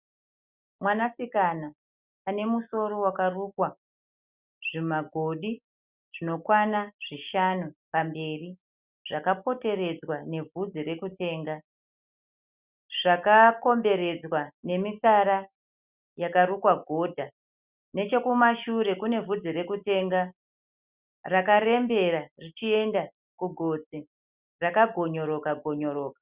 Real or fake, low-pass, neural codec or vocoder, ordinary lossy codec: real; 3.6 kHz; none; Opus, 64 kbps